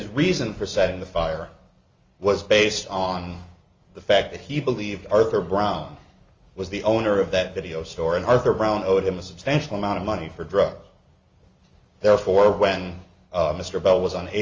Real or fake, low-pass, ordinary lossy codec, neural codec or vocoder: real; 7.2 kHz; Opus, 32 kbps; none